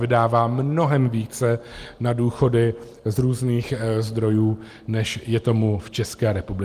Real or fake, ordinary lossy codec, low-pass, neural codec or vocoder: real; Opus, 24 kbps; 14.4 kHz; none